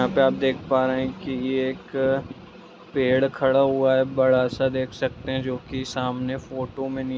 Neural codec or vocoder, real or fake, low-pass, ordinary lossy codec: none; real; none; none